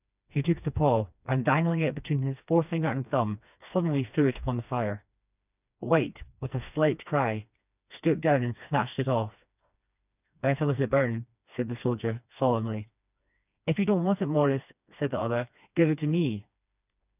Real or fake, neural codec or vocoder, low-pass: fake; codec, 16 kHz, 2 kbps, FreqCodec, smaller model; 3.6 kHz